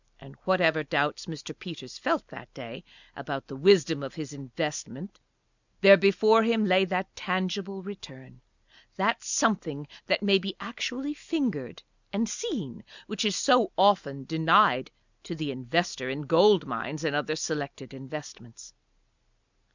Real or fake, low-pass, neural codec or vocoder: real; 7.2 kHz; none